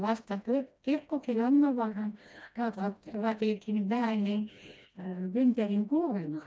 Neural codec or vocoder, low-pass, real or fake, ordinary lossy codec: codec, 16 kHz, 1 kbps, FreqCodec, smaller model; none; fake; none